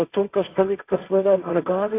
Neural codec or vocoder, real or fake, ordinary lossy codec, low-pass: codec, 16 kHz, 1.1 kbps, Voila-Tokenizer; fake; AAC, 24 kbps; 3.6 kHz